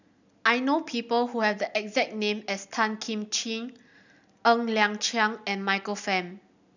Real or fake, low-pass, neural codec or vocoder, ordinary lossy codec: real; 7.2 kHz; none; none